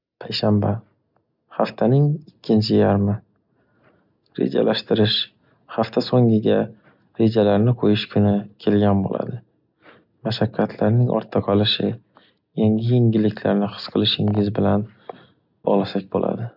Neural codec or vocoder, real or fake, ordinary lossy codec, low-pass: none; real; none; 5.4 kHz